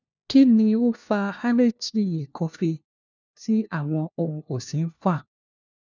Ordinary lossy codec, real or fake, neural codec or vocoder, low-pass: none; fake; codec, 16 kHz, 1 kbps, FunCodec, trained on LibriTTS, 50 frames a second; 7.2 kHz